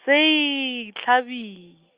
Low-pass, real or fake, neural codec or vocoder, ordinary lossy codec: 3.6 kHz; real; none; Opus, 24 kbps